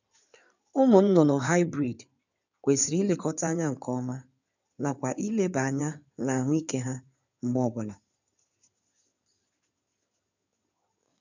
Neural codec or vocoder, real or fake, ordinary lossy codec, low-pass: codec, 16 kHz in and 24 kHz out, 2.2 kbps, FireRedTTS-2 codec; fake; none; 7.2 kHz